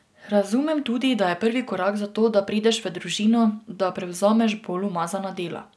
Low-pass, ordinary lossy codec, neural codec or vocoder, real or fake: none; none; none; real